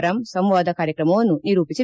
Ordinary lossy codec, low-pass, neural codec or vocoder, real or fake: none; none; none; real